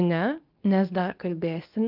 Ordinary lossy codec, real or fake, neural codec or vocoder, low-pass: Opus, 24 kbps; fake; codec, 24 kHz, 0.9 kbps, WavTokenizer, medium speech release version 2; 5.4 kHz